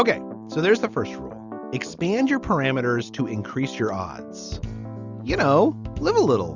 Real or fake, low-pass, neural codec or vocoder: real; 7.2 kHz; none